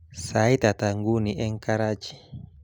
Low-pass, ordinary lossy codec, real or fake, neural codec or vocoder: 19.8 kHz; none; real; none